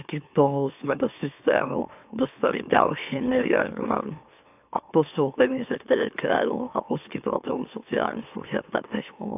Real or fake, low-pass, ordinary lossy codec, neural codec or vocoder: fake; 3.6 kHz; AAC, 32 kbps; autoencoder, 44.1 kHz, a latent of 192 numbers a frame, MeloTTS